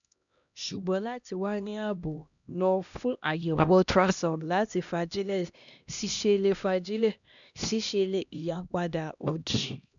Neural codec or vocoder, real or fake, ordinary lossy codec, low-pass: codec, 16 kHz, 1 kbps, X-Codec, HuBERT features, trained on LibriSpeech; fake; none; 7.2 kHz